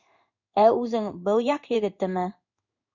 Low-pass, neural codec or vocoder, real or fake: 7.2 kHz; codec, 16 kHz in and 24 kHz out, 1 kbps, XY-Tokenizer; fake